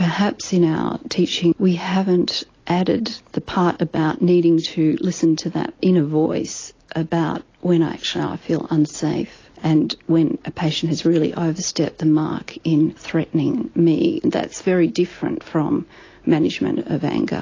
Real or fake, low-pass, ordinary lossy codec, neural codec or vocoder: real; 7.2 kHz; AAC, 32 kbps; none